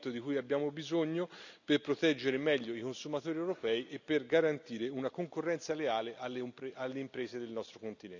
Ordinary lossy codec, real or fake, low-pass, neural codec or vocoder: none; real; 7.2 kHz; none